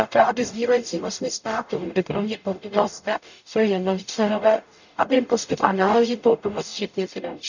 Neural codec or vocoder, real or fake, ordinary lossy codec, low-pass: codec, 44.1 kHz, 0.9 kbps, DAC; fake; none; 7.2 kHz